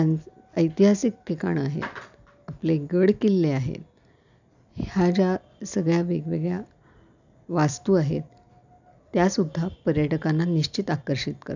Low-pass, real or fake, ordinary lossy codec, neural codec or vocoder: 7.2 kHz; real; none; none